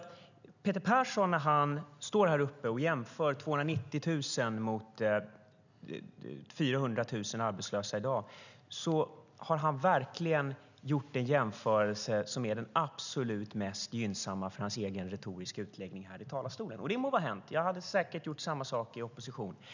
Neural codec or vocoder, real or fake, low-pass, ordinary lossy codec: none; real; 7.2 kHz; none